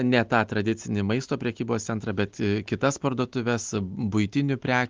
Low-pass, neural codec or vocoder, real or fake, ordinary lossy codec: 7.2 kHz; none; real; Opus, 32 kbps